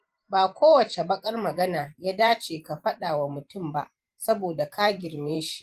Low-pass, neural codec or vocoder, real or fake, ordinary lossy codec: 14.4 kHz; vocoder, 44.1 kHz, 128 mel bands every 512 samples, BigVGAN v2; fake; Opus, 24 kbps